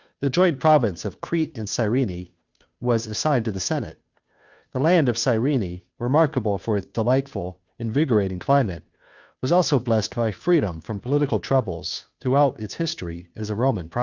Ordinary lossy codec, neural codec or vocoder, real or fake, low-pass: Opus, 64 kbps; codec, 16 kHz, 2 kbps, FunCodec, trained on Chinese and English, 25 frames a second; fake; 7.2 kHz